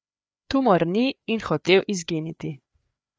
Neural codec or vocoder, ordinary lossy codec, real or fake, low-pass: codec, 16 kHz, 4 kbps, FreqCodec, larger model; none; fake; none